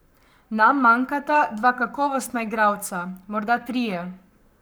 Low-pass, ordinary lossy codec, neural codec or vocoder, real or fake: none; none; codec, 44.1 kHz, 7.8 kbps, Pupu-Codec; fake